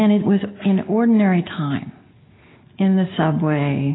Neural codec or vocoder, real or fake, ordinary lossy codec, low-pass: none; real; AAC, 16 kbps; 7.2 kHz